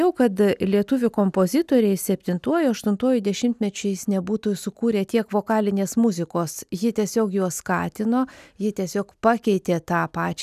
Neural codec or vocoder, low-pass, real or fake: none; 14.4 kHz; real